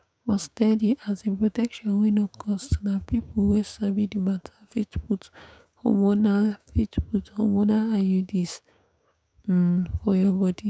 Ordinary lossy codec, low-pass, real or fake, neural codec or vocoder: none; none; fake; codec, 16 kHz, 6 kbps, DAC